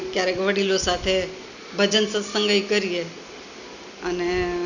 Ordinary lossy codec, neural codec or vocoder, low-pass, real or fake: none; none; 7.2 kHz; real